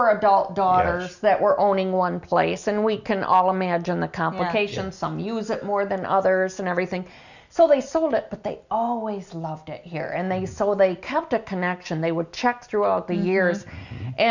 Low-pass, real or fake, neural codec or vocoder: 7.2 kHz; real; none